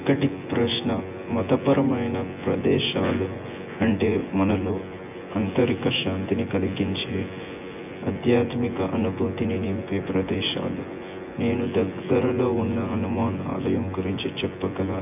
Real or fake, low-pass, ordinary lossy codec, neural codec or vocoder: fake; 3.6 kHz; none; vocoder, 24 kHz, 100 mel bands, Vocos